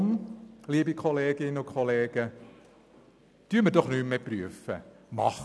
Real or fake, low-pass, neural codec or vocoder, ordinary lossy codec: real; none; none; none